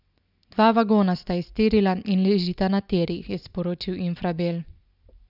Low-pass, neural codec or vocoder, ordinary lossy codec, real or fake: 5.4 kHz; none; none; real